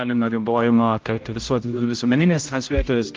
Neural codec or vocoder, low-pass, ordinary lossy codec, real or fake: codec, 16 kHz, 0.5 kbps, X-Codec, HuBERT features, trained on general audio; 7.2 kHz; Opus, 32 kbps; fake